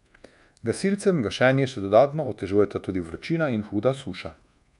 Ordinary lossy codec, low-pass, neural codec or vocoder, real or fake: none; 10.8 kHz; codec, 24 kHz, 1.2 kbps, DualCodec; fake